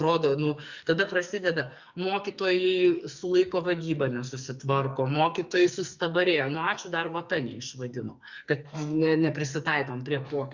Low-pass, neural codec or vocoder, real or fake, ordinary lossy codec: 7.2 kHz; codec, 44.1 kHz, 2.6 kbps, SNAC; fake; Opus, 64 kbps